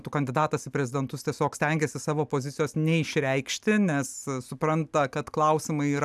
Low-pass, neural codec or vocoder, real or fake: 14.4 kHz; none; real